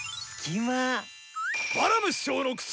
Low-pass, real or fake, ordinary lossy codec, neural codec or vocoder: none; real; none; none